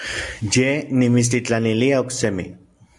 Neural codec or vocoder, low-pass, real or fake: none; 10.8 kHz; real